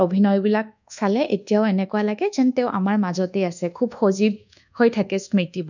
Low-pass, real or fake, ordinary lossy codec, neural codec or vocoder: 7.2 kHz; fake; none; codec, 24 kHz, 1.2 kbps, DualCodec